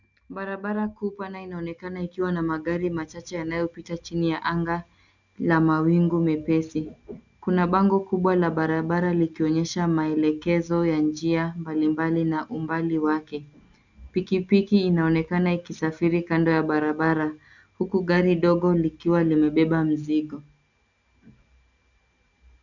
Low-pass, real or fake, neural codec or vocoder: 7.2 kHz; real; none